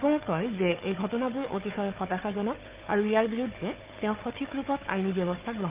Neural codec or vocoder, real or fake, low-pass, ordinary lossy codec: codec, 16 kHz, 4 kbps, FunCodec, trained on Chinese and English, 50 frames a second; fake; 3.6 kHz; Opus, 32 kbps